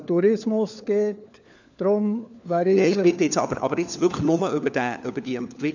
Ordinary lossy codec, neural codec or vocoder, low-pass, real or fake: none; codec, 16 kHz, 4 kbps, FunCodec, trained on LibriTTS, 50 frames a second; 7.2 kHz; fake